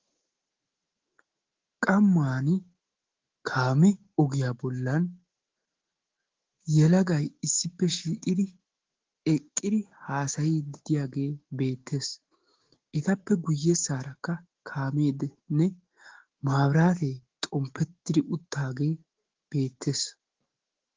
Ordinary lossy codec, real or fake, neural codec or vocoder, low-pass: Opus, 16 kbps; fake; autoencoder, 48 kHz, 128 numbers a frame, DAC-VAE, trained on Japanese speech; 7.2 kHz